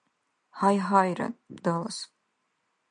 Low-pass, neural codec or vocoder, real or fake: 9.9 kHz; none; real